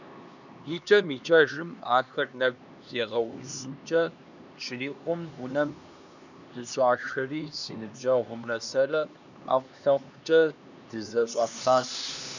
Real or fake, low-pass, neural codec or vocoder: fake; 7.2 kHz; codec, 16 kHz, 2 kbps, X-Codec, HuBERT features, trained on LibriSpeech